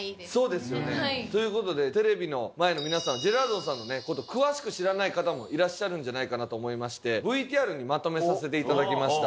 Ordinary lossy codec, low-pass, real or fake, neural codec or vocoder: none; none; real; none